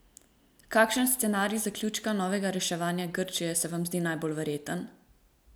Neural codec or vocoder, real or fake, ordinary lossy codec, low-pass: none; real; none; none